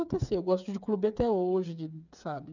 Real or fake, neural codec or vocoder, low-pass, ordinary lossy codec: fake; codec, 16 kHz, 8 kbps, FreqCodec, smaller model; 7.2 kHz; none